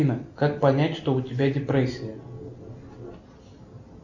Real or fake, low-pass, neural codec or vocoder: real; 7.2 kHz; none